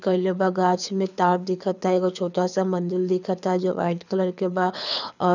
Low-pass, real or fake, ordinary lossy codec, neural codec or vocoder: 7.2 kHz; fake; none; codec, 24 kHz, 6 kbps, HILCodec